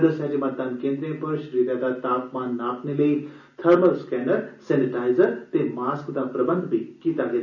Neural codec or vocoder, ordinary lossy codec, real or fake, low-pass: none; none; real; 7.2 kHz